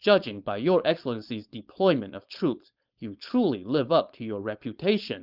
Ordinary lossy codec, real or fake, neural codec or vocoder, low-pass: Opus, 32 kbps; fake; codec, 16 kHz, 4.8 kbps, FACodec; 5.4 kHz